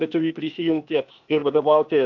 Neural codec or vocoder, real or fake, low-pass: codec, 16 kHz, 0.8 kbps, ZipCodec; fake; 7.2 kHz